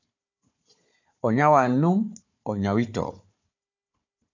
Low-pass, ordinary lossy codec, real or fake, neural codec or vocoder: 7.2 kHz; AAC, 48 kbps; fake; codec, 16 kHz, 4 kbps, FunCodec, trained on Chinese and English, 50 frames a second